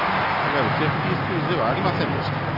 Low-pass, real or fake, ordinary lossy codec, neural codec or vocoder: 5.4 kHz; real; none; none